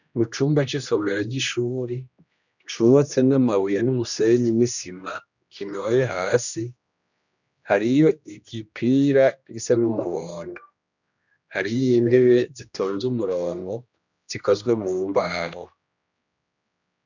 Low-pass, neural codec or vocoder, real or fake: 7.2 kHz; codec, 16 kHz, 1 kbps, X-Codec, HuBERT features, trained on general audio; fake